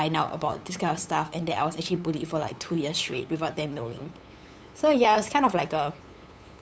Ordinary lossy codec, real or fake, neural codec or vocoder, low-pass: none; fake; codec, 16 kHz, 8 kbps, FunCodec, trained on LibriTTS, 25 frames a second; none